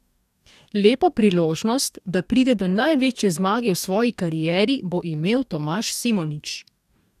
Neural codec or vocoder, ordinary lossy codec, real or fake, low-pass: codec, 44.1 kHz, 2.6 kbps, DAC; none; fake; 14.4 kHz